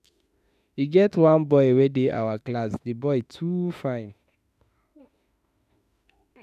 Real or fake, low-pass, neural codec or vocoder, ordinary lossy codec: fake; 14.4 kHz; autoencoder, 48 kHz, 32 numbers a frame, DAC-VAE, trained on Japanese speech; none